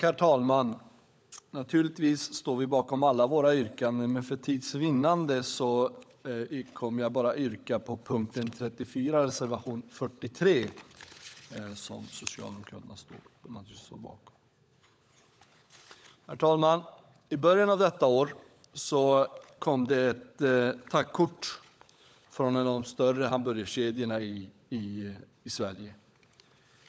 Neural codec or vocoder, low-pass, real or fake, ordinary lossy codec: codec, 16 kHz, 16 kbps, FunCodec, trained on LibriTTS, 50 frames a second; none; fake; none